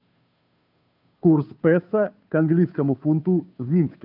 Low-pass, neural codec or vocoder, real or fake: 5.4 kHz; codec, 16 kHz, 2 kbps, FunCodec, trained on Chinese and English, 25 frames a second; fake